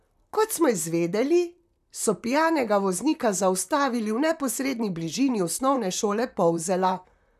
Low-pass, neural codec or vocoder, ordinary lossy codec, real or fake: 14.4 kHz; vocoder, 44.1 kHz, 128 mel bands, Pupu-Vocoder; none; fake